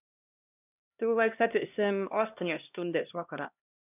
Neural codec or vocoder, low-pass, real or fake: codec, 16 kHz, 1 kbps, X-Codec, HuBERT features, trained on LibriSpeech; 3.6 kHz; fake